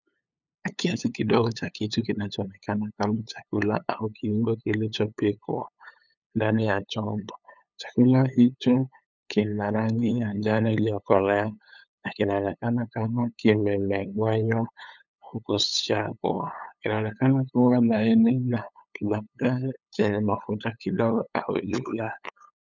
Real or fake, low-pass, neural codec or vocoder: fake; 7.2 kHz; codec, 16 kHz, 8 kbps, FunCodec, trained on LibriTTS, 25 frames a second